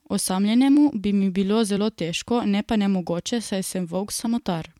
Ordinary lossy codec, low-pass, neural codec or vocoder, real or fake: MP3, 96 kbps; 19.8 kHz; vocoder, 44.1 kHz, 128 mel bands every 256 samples, BigVGAN v2; fake